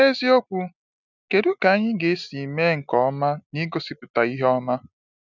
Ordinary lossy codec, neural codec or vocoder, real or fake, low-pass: none; none; real; 7.2 kHz